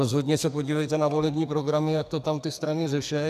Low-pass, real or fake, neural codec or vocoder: 14.4 kHz; fake; codec, 44.1 kHz, 2.6 kbps, SNAC